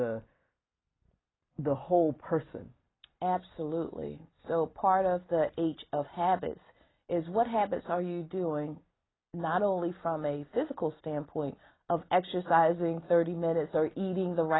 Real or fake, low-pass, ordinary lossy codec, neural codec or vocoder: real; 7.2 kHz; AAC, 16 kbps; none